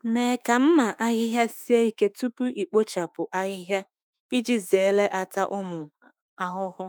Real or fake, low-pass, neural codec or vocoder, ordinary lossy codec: fake; none; autoencoder, 48 kHz, 32 numbers a frame, DAC-VAE, trained on Japanese speech; none